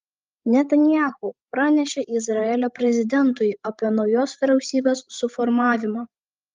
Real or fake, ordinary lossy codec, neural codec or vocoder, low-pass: fake; Opus, 32 kbps; codec, 16 kHz, 16 kbps, FreqCodec, larger model; 7.2 kHz